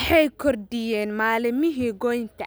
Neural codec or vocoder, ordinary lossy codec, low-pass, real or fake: none; none; none; real